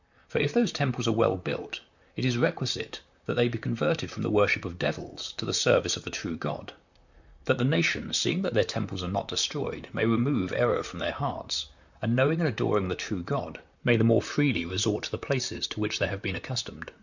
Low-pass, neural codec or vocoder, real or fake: 7.2 kHz; vocoder, 44.1 kHz, 128 mel bands, Pupu-Vocoder; fake